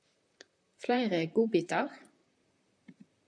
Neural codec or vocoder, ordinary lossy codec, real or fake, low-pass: vocoder, 44.1 kHz, 128 mel bands, Pupu-Vocoder; AAC, 64 kbps; fake; 9.9 kHz